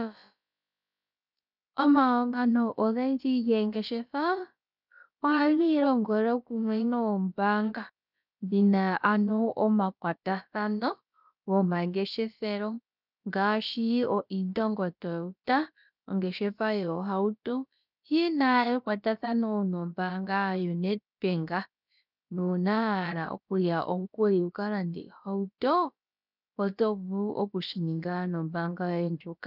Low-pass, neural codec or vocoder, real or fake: 5.4 kHz; codec, 16 kHz, about 1 kbps, DyCAST, with the encoder's durations; fake